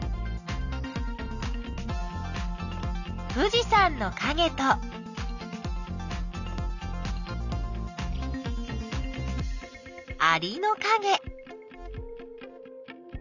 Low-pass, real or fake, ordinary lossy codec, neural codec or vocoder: 7.2 kHz; real; none; none